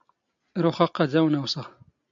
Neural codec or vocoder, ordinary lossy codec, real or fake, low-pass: none; MP3, 64 kbps; real; 7.2 kHz